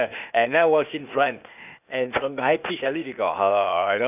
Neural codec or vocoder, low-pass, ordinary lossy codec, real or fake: codec, 16 kHz, 0.8 kbps, ZipCodec; 3.6 kHz; none; fake